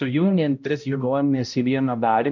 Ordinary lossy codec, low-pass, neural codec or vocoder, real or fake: MP3, 64 kbps; 7.2 kHz; codec, 16 kHz, 0.5 kbps, X-Codec, HuBERT features, trained on balanced general audio; fake